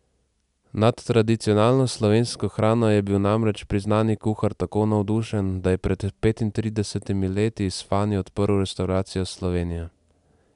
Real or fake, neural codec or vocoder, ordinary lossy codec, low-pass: real; none; none; 10.8 kHz